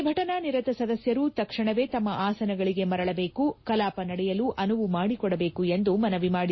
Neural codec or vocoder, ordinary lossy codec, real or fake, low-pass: none; MP3, 24 kbps; real; 7.2 kHz